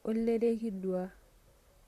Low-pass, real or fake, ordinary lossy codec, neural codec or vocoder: 14.4 kHz; fake; Opus, 64 kbps; vocoder, 44.1 kHz, 128 mel bands, Pupu-Vocoder